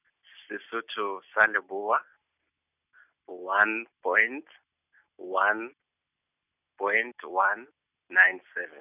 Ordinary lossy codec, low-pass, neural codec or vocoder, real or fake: none; 3.6 kHz; none; real